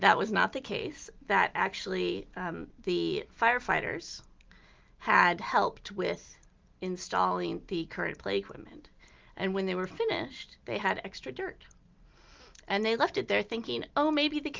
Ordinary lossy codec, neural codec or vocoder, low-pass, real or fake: Opus, 32 kbps; none; 7.2 kHz; real